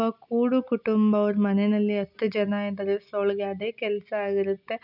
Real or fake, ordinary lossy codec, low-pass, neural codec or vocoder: real; none; 5.4 kHz; none